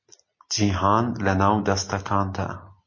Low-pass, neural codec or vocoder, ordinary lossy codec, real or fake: 7.2 kHz; none; MP3, 32 kbps; real